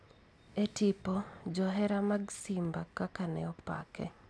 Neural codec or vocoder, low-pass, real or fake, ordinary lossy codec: none; none; real; none